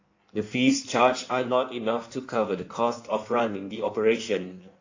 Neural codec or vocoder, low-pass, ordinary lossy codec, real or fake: codec, 16 kHz in and 24 kHz out, 1.1 kbps, FireRedTTS-2 codec; 7.2 kHz; AAC, 48 kbps; fake